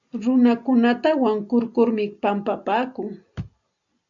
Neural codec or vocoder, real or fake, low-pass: none; real; 7.2 kHz